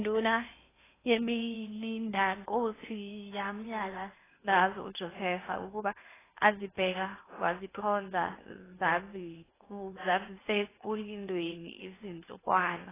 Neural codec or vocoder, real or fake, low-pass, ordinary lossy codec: codec, 16 kHz in and 24 kHz out, 0.8 kbps, FocalCodec, streaming, 65536 codes; fake; 3.6 kHz; AAC, 16 kbps